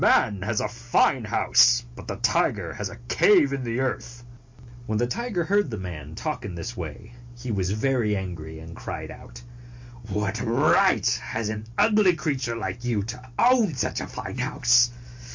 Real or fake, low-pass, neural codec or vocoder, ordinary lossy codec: real; 7.2 kHz; none; MP3, 48 kbps